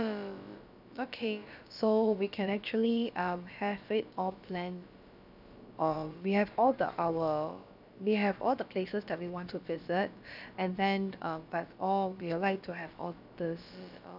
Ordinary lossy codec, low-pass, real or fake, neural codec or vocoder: none; 5.4 kHz; fake; codec, 16 kHz, about 1 kbps, DyCAST, with the encoder's durations